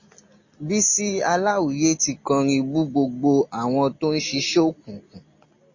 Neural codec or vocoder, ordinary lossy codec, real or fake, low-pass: none; MP3, 32 kbps; real; 7.2 kHz